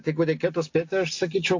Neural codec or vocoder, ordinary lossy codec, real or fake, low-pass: none; AAC, 48 kbps; real; 7.2 kHz